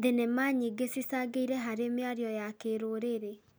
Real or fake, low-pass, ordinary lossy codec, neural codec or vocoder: real; none; none; none